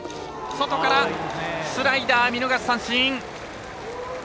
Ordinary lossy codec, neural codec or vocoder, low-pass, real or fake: none; none; none; real